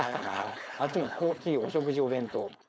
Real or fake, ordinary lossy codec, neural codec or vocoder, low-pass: fake; none; codec, 16 kHz, 4.8 kbps, FACodec; none